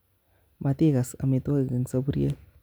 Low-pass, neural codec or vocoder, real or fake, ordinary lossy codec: none; none; real; none